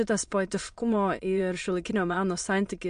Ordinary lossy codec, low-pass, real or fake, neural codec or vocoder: MP3, 48 kbps; 9.9 kHz; fake; autoencoder, 22.05 kHz, a latent of 192 numbers a frame, VITS, trained on many speakers